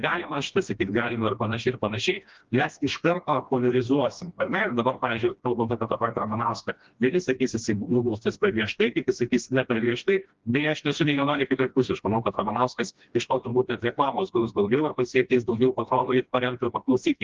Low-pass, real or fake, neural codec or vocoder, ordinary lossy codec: 7.2 kHz; fake; codec, 16 kHz, 1 kbps, FreqCodec, smaller model; Opus, 32 kbps